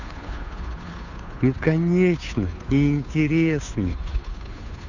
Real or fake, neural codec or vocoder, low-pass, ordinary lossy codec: fake; codec, 16 kHz, 2 kbps, FunCodec, trained on Chinese and English, 25 frames a second; 7.2 kHz; none